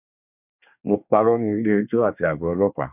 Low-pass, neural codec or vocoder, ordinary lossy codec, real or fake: 3.6 kHz; codec, 24 kHz, 1 kbps, SNAC; none; fake